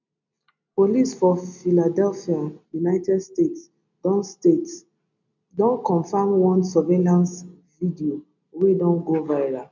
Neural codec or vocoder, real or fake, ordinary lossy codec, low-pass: none; real; none; 7.2 kHz